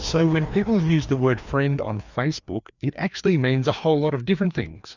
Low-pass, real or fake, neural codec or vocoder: 7.2 kHz; fake; codec, 16 kHz, 2 kbps, FreqCodec, larger model